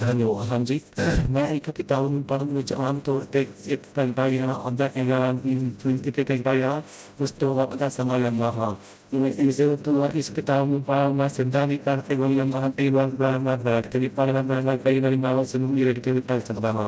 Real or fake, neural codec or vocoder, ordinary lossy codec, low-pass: fake; codec, 16 kHz, 0.5 kbps, FreqCodec, smaller model; none; none